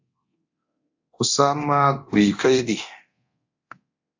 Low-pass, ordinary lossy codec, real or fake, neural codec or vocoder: 7.2 kHz; AAC, 48 kbps; fake; codec, 24 kHz, 0.9 kbps, DualCodec